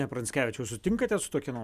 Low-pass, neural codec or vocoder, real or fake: 14.4 kHz; none; real